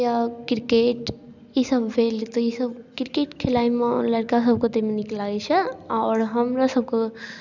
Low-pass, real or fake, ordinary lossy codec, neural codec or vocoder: 7.2 kHz; real; none; none